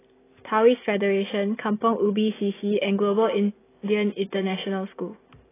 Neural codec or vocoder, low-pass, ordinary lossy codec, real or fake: none; 3.6 kHz; AAC, 16 kbps; real